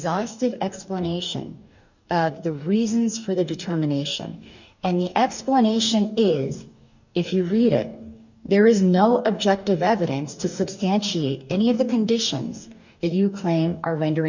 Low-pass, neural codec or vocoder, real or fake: 7.2 kHz; codec, 44.1 kHz, 2.6 kbps, DAC; fake